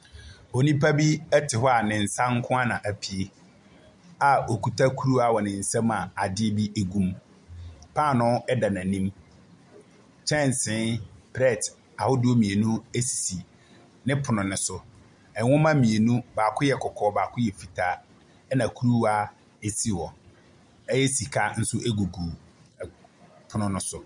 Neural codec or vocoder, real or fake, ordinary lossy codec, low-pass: none; real; MP3, 96 kbps; 10.8 kHz